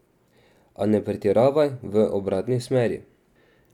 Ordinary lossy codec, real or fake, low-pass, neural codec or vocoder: none; real; 19.8 kHz; none